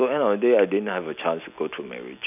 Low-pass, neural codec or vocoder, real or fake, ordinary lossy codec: 3.6 kHz; none; real; none